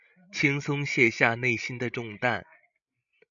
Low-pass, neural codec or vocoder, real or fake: 7.2 kHz; codec, 16 kHz, 16 kbps, FreqCodec, larger model; fake